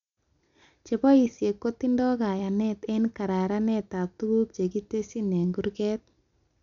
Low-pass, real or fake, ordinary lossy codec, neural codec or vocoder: 7.2 kHz; real; none; none